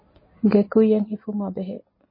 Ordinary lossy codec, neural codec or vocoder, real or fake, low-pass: MP3, 24 kbps; none; real; 5.4 kHz